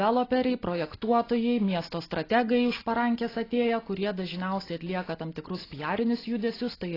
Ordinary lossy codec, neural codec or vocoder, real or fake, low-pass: AAC, 24 kbps; none; real; 5.4 kHz